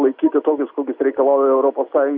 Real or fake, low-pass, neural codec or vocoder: real; 5.4 kHz; none